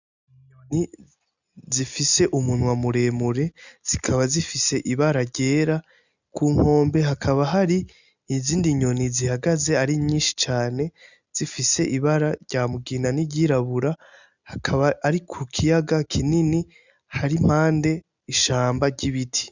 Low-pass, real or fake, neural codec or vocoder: 7.2 kHz; real; none